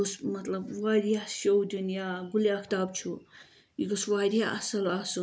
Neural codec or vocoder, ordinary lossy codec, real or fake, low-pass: none; none; real; none